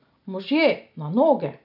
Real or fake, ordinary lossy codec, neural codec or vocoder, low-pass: real; none; none; 5.4 kHz